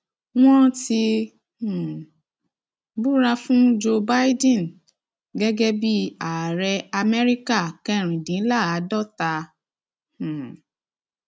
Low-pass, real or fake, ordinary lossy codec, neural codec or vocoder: none; real; none; none